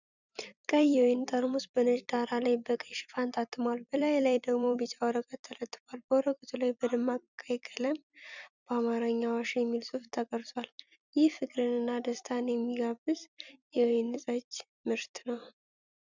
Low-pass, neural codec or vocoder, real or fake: 7.2 kHz; vocoder, 44.1 kHz, 128 mel bands every 256 samples, BigVGAN v2; fake